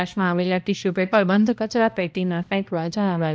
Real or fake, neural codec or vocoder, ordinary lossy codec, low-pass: fake; codec, 16 kHz, 1 kbps, X-Codec, HuBERT features, trained on balanced general audio; none; none